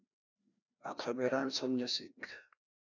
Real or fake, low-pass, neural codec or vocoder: fake; 7.2 kHz; codec, 16 kHz, 1 kbps, FreqCodec, larger model